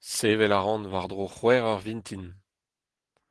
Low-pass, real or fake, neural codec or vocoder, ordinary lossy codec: 10.8 kHz; real; none; Opus, 16 kbps